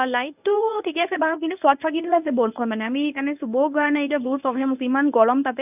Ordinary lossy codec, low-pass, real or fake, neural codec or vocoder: none; 3.6 kHz; fake; codec, 24 kHz, 0.9 kbps, WavTokenizer, medium speech release version 1